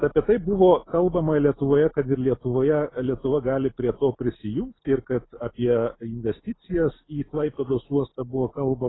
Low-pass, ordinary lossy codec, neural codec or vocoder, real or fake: 7.2 kHz; AAC, 16 kbps; none; real